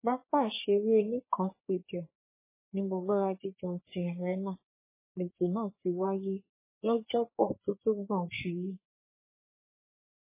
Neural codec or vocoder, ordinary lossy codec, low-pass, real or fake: codec, 44.1 kHz, 3.4 kbps, Pupu-Codec; MP3, 16 kbps; 3.6 kHz; fake